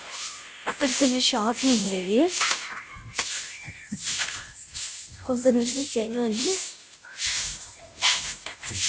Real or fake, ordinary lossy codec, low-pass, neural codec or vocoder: fake; none; none; codec, 16 kHz, 0.5 kbps, FunCodec, trained on Chinese and English, 25 frames a second